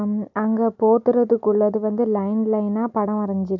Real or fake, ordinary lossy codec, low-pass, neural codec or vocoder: real; MP3, 64 kbps; 7.2 kHz; none